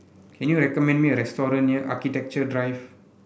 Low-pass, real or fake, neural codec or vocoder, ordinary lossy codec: none; real; none; none